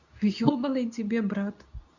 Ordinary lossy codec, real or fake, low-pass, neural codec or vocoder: none; fake; 7.2 kHz; codec, 24 kHz, 0.9 kbps, WavTokenizer, medium speech release version 2